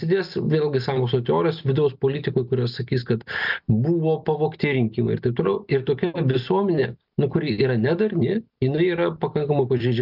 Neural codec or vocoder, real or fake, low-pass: none; real; 5.4 kHz